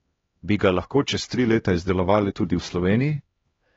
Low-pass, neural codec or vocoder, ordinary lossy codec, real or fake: 7.2 kHz; codec, 16 kHz, 1 kbps, X-Codec, HuBERT features, trained on LibriSpeech; AAC, 24 kbps; fake